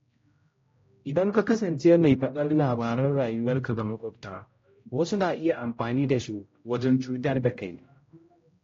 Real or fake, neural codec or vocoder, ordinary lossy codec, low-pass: fake; codec, 16 kHz, 0.5 kbps, X-Codec, HuBERT features, trained on general audio; AAC, 32 kbps; 7.2 kHz